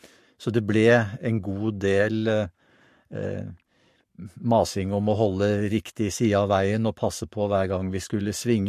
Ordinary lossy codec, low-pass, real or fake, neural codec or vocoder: MP3, 64 kbps; 14.4 kHz; fake; codec, 44.1 kHz, 7.8 kbps, Pupu-Codec